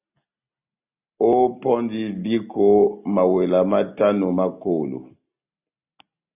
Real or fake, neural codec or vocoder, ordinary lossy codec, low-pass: real; none; AAC, 32 kbps; 3.6 kHz